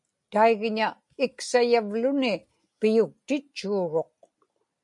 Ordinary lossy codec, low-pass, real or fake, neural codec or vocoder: MP3, 64 kbps; 10.8 kHz; real; none